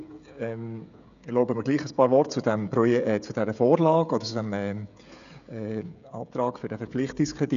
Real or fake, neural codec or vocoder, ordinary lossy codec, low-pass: fake; codec, 16 kHz, 16 kbps, FreqCodec, smaller model; none; 7.2 kHz